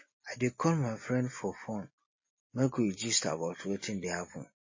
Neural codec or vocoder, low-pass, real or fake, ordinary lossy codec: none; 7.2 kHz; real; MP3, 32 kbps